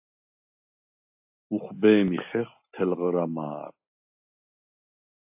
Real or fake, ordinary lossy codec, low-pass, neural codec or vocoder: real; AAC, 32 kbps; 3.6 kHz; none